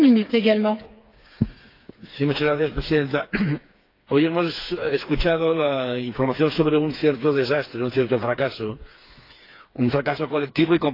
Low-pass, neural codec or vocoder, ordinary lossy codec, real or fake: 5.4 kHz; codec, 16 kHz, 4 kbps, FreqCodec, smaller model; AAC, 32 kbps; fake